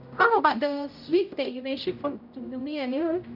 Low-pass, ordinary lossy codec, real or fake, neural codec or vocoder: 5.4 kHz; none; fake; codec, 16 kHz, 0.5 kbps, X-Codec, HuBERT features, trained on general audio